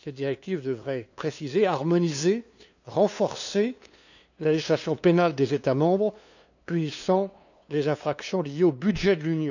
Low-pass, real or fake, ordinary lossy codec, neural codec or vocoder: 7.2 kHz; fake; none; codec, 16 kHz, 2 kbps, FunCodec, trained on LibriTTS, 25 frames a second